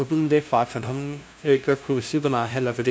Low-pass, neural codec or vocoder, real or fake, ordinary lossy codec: none; codec, 16 kHz, 0.5 kbps, FunCodec, trained on LibriTTS, 25 frames a second; fake; none